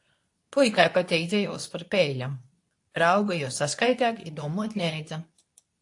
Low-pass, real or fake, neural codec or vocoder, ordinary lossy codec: 10.8 kHz; fake; codec, 24 kHz, 0.9 kbps, WavTokenizer, medium speech release version 2; AAC, 64 kbps